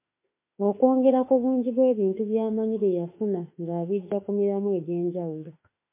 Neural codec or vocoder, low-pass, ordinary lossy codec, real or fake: autoencoder, 48 kHz, 32 numbers a frame, DAC-VAE, trained on Japanese speech; 3.6 kHz; MP3, 24 kbps; fake